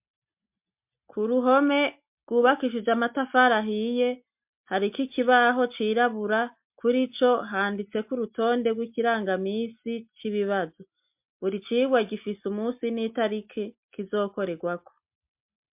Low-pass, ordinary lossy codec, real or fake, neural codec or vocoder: 3.6 kHz; MP3, 32 kbps; real; none